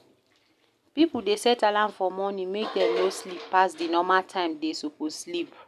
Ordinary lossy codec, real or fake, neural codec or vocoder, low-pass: none; real; none; 14.4 kHz